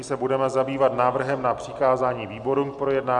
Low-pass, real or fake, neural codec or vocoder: 10.8 kHz; real; none